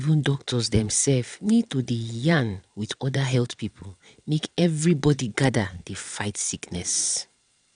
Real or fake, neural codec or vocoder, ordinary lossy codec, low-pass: fake; vocoder, 22.05 kHz, 80 mel bands, Vocos; none; 9.9 kHz